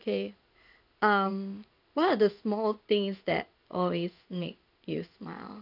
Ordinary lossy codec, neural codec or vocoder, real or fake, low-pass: none; codec, 16 kHz in and 24 kHz out, 1 kbps, XY-Tokenizer; fake; 5.4 kHz